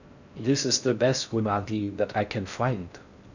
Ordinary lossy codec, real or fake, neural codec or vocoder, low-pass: none; fake; codec, 16 kHz in and 24 kHz out, 0.6 kbps, FocalCodec, streaming, 4096 codes; 7.2 kHz